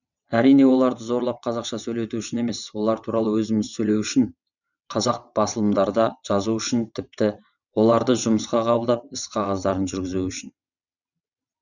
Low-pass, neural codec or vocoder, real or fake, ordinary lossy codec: 7.2 kHz; vocoder, 22.05 kHz, 80 mel bands, WaveNeXt; fake; none